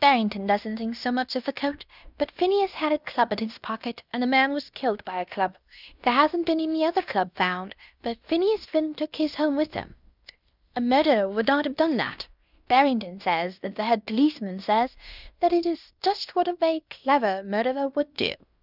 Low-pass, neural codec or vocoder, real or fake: 5.4 kHz; codec, 16 kHz in and 24 kHz out, 0.9 kbps, LongCat-Audio-Codec, fine tuned four codebook decoder; fake